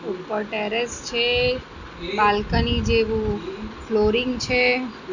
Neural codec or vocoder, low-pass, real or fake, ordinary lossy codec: none; 7.2 kHz; real; none